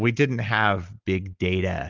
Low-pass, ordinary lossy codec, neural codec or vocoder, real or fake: 7.2 kHz; Opus, 32 kbps; none; real